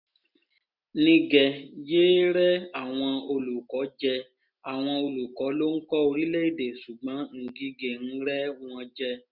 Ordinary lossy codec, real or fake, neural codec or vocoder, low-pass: none; real; none; 5.4 kHz